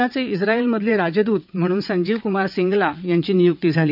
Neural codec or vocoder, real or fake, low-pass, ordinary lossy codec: vocoder, 22.05 kHz, 80 mel bands, WaveNeXt; fake; 5.4 kHz; none